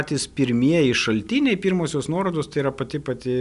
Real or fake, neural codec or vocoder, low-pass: real; none; 10.8 kHz